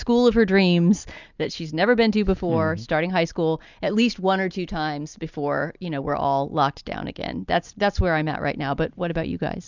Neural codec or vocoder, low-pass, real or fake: none; 7.2 kHz; real